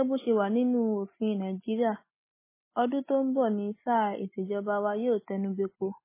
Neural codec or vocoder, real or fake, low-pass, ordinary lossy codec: none; real; 3.6 kHz; MP3, 16 kbps